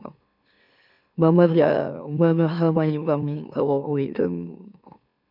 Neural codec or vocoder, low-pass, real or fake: autoencoder, 44.1 kHz, a latent of 192 numbers a frame, MeloTTS; 5.4 kHz; fake